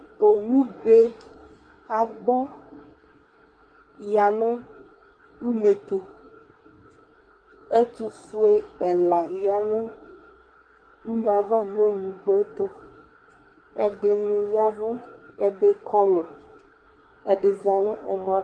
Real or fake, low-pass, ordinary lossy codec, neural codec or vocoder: fake; 9.9 kHz; Opus, 32 kbps; codec, 24 kHz, 1 kbps, SNAC